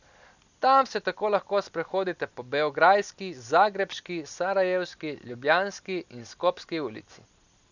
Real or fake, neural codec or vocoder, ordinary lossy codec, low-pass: real; none; none; 7.2 kHz